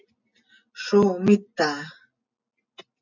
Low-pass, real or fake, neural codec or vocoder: 7.2 kHz; real; none